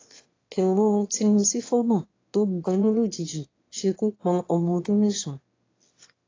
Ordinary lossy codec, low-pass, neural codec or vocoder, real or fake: AAC, 32 kbps; 7.2 kHz; autoencoder, 22.05 kHz, a latent of 192 numbers a frame, VITS, trained on one speaker; fake